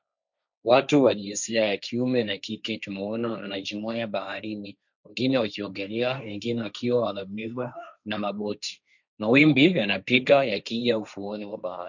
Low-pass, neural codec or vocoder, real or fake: 7.2 kHz; codec, 16 kHz, 1.1 kbps, Voila-Tokenizer; fake